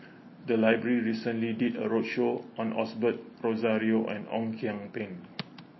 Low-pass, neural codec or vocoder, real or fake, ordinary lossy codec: 7.2 kHz; none; real; MP3, 24 kbps